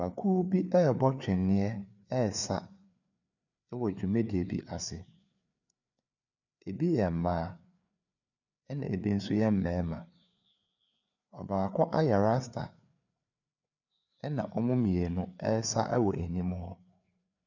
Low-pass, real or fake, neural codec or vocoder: 7.2 kHz; fake; codec, 16 kHz, 4 kbps, FreqCodec, larger model